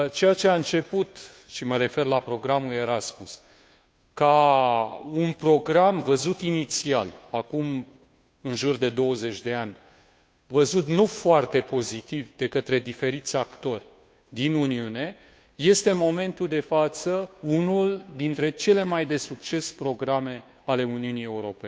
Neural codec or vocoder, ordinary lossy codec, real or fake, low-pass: codec, 16 kHz, 2 kbps, FunCodec, trained on Chinese and English, 25 frames a second; none; fake; none